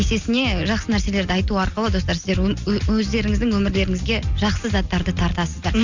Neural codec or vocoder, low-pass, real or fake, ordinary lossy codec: none; 7.2 kHz; real; Opus, 64 kbps